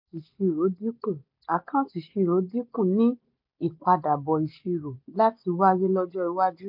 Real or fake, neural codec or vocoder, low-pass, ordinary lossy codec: real; none; 5.4 kHz; MP3, 48 kbps